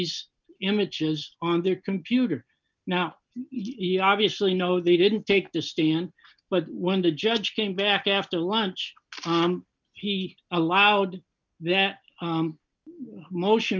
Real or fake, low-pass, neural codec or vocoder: real; 7.2 kHz; none